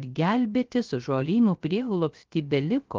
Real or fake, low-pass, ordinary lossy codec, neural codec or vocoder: fake; 7.2 kHz; Opus, 32 kbps; codec, 16 kHz, 0.3 kbps, FocalCodec